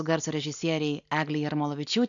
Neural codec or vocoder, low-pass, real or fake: none; 7.2 kHz; real